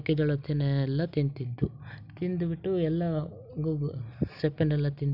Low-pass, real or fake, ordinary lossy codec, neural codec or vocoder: 5.4 kHz; real; none; none